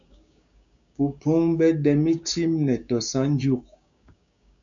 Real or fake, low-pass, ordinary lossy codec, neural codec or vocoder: fake; 7.2 kHz; MP3, 96 kbps; codec, 16 kHz, 6 kbps, DAC